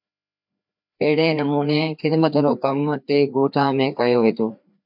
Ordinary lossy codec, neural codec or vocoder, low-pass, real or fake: MP3, 48 kbps; codec, 16 kHz, 2 kbps, FreqCodec, larger model; 5.4 kHz; fake